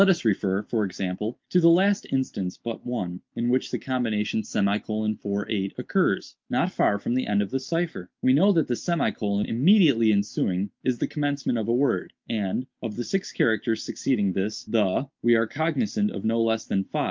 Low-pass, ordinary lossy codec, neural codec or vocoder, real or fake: 7.2 kHz; Opus, 32 kbps; none; real